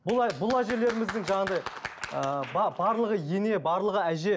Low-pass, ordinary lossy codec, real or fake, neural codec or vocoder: none; none; real; none